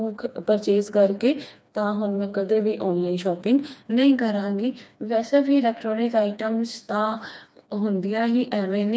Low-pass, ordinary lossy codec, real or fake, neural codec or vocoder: none; none; fake; codec, 16 kHz, 2 kbps, FreqCodec, smaller model